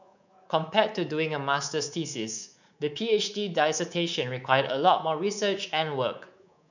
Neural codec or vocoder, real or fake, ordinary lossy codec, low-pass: codec, 24 kHz, 3.1 kbps, DualCodec; fake; none; 7.2 kHz